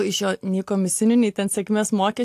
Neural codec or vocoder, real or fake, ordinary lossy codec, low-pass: none; real; AAC, 64 kbps; 14.4 kHz